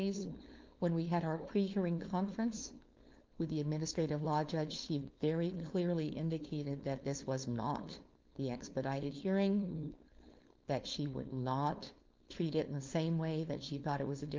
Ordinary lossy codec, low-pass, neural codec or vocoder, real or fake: Opus, 24 kbps; 7.2 kHz; codec, 16 kHz, 4.8 kbps, FACodec; fake